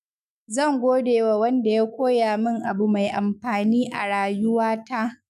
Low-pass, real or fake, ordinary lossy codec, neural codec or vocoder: 14.4 kHz; fake; none; autoencoder, 48 kHz, 128 numbers a frame, DAC-VAE, trained on Japanese speech